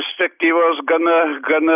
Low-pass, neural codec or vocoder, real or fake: 3.6 kHz; none; real